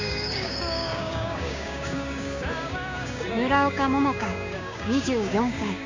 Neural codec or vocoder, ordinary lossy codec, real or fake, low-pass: codec, 16 kHz, 6 kbps, DAC; MP3, 64 kbps; fake; 7.2 kHz